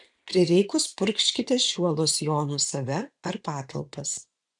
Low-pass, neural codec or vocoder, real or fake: 10.8 kHz; vocoder, 24 kHz, 100 mel bands, Vocos; fake